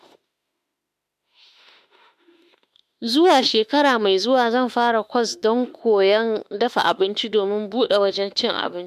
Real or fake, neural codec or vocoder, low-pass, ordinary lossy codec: fake; autoencoder, 48 kHz, 32 numbers a frame, DAC-VAE, trained on Japanese speech; 14.4 kHz; MP3, 96 kbps